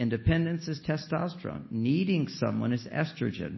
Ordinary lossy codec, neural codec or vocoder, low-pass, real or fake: MP3, 24 kbps; none; 7.2 kHz; real